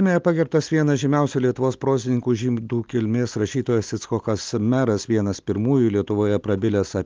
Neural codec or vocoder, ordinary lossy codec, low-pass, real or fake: none; Opus, 32 kbps; 7.2 kHz; real